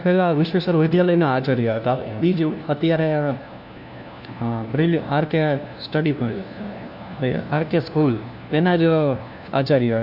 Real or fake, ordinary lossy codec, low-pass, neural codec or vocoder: fake; none; 5.4 kHz; codec, 16 kHz, 1 kbps, FunCodec, trained on LibriTTS, 50 frames a second